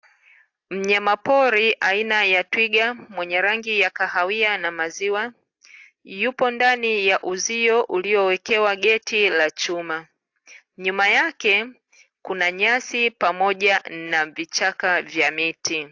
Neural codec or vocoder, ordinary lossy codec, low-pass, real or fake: none; AAC, 48 kbps; 7.2 kHz; real